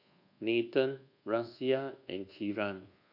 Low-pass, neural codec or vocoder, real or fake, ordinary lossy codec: 5.4 kHz; codec, 24 kHz, 1.2 kbps, DualCodec; fake; none